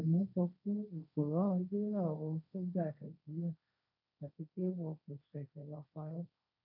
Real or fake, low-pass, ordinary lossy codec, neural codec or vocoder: fake; 5.4 kHz; none; codec, 16 kHz, 1.1 kbps, Voila-Tokenizer